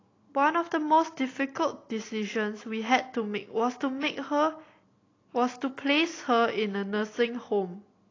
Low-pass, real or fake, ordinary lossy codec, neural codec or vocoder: 7.2 kHz; real; AAC, 32 kbps; none